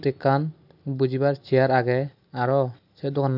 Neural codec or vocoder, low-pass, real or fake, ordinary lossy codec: none; 5.4 kHz; real; none